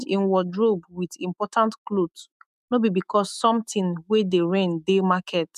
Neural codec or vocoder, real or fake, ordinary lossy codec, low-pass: autoencoder, 48 kHz, 128 numbers a frame, DAC-VAE, trained on Japanese speech; fake; none; 14.4 kHz